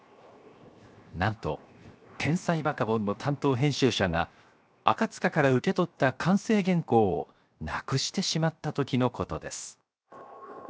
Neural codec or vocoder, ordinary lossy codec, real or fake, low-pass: codec, 16 kHz, 0.7 kbps, FocalCodec; none; fake; none